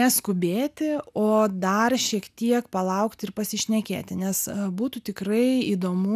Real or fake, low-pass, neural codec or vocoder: real; 14.4 kHz; none